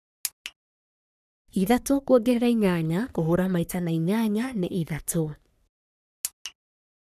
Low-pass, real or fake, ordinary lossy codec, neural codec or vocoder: 14.4 kHz; fake; none; codec, 44.1 kHz, 3.4 kbps, Pupu-Codec